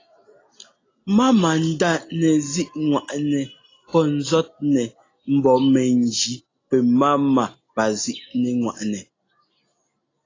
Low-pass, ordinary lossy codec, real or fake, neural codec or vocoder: 7.2 kHz; AAC, 32 kbps; real; none